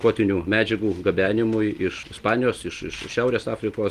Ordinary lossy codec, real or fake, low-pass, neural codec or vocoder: Opus, 24 kbps; real; 14.4 kHz; none